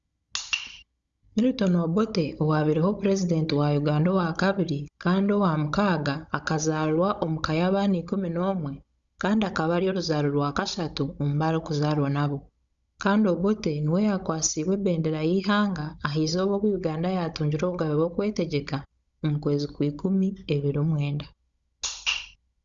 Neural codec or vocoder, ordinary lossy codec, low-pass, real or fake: codec, 16 kHz, 16 kbps, FunCodec, trained on Chinese and English, 50 frames a second; Opus, 64 kbps; 7.2 kHz; fake